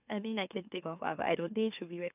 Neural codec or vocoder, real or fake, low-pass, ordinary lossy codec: autoencoder, 44.1 kHz, a latent of 192 numbers a frame, MeloTTS; fake; 3.6 kHz; none